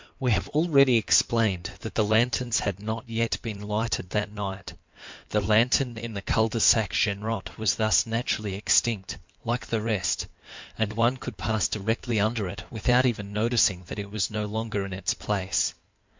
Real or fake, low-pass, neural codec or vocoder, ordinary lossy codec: fake; 7.2 kHz; codec, 16 kHz in and 24 kHz out, 2.2 kbps, FireRedTTS-2 codec; MP3, 64 kbps